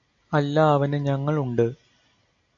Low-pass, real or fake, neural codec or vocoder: 7.2 kHz; real; none